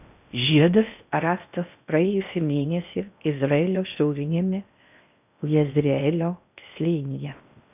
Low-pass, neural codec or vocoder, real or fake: 3.6 kHz; codec, 16 kHz in and 24 kHz out, 0.6 kbps, FocalCodec, streaming, 4096 codes; fake